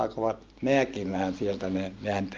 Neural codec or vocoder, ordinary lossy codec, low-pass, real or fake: none; Opus, 24 kbps; 7.2 kHz; real